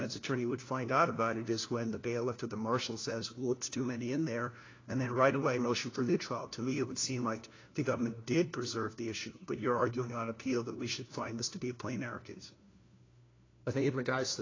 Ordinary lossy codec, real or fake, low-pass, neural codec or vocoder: AAC, 32 kbps; fake; 7.2 kHz; codec, 16 kHz, 1 kbps, FunCodec, trained on LibriTTS, 50 frames a second